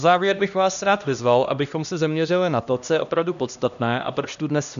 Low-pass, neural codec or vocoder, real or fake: 7.2 kHz; codec, 16 kHz, 1 kbps, X-Codec, HuBERT features, trained on LibriSpeech; fake